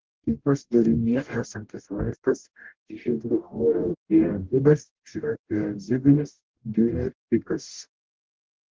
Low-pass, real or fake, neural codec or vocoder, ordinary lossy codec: 7.2 kHz; fake; codec, 44.1 kHz, 0.9 kbps, DAC; Opus, 16 kbps